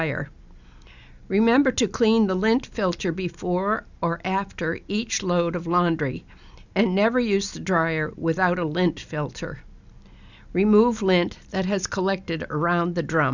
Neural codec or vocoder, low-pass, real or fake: none; 7.2 kHz; real